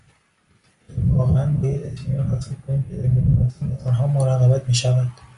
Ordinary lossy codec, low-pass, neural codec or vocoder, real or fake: AAC, 96 kbps; 10.8 kHz; none; real